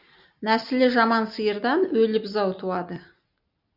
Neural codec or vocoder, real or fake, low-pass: none; real; 5.4 kHz